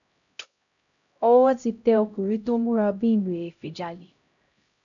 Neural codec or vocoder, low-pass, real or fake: codec, 16 kHz, 0.5 kbps, X-Codec, HuBERT features, trained on LibriSpeech; 7.2 kHz; fake